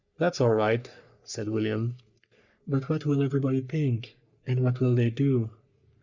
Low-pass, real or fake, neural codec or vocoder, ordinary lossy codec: 7.2 kHz; fake; codec, 44.1 kHz, 3.4 kbps, Pupu-Codec; Opus, 64 kbps